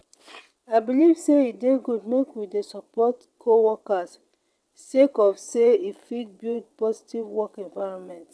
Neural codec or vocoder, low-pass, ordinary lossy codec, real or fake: vocoder, 22.05 kHz, 80 mel bands, WaveNeXt; none; none; fake